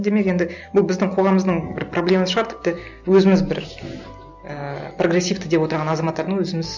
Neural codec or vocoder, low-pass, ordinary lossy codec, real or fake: none; 7.2 kHz; none; real